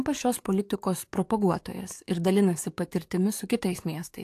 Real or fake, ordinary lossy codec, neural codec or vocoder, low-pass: fake; AAC, 64 kbps; codec, 44.1 kHz, 7.8 kbps, DAC; 14.4 kHz